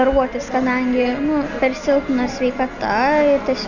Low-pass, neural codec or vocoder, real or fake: 7.2 kHz; none; real